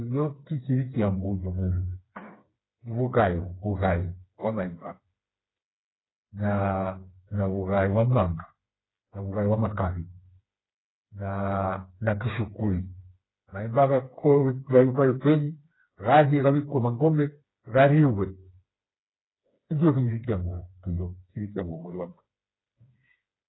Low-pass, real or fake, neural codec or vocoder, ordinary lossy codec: 7.2 kHz; fake; codec, 16 kHz, 4 kbps, FreqCodec, smaller model; AAC, 16 kbps